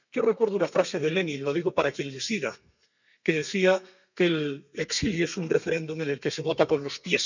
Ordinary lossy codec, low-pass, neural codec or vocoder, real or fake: none; 7.2 kHz; codec, 32 kHz, 1.9 kbps, SNAC; fake